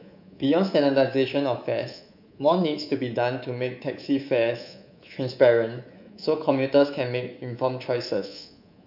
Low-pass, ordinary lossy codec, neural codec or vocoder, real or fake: 5.4 kHz; none; codec, 24 kHz, 3.1 kbps, DualCodec; fake